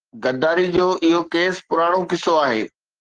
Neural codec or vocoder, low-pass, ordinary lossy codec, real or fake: codec, 44.1 kHz, 7.8 kbps, Pupu-Codec; 9.9 kHz; Opus, 16 kbps; fake